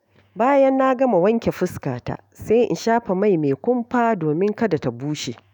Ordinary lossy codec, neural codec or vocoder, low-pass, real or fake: none; autoencoder, 48 kHz, 128 numbers a frame, DAC-VAE, trained on Japanese speech; none; fake